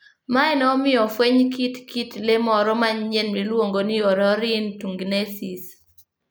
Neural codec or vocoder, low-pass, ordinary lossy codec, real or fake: none; none; none; real